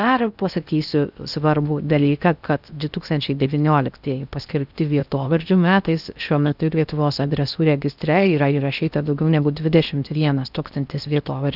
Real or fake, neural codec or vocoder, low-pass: fake; codec, 16 kHz in and 24 kHz out, 0.6 kbps, FocalCodec, streaming, 4096 codes; 5.4 kHz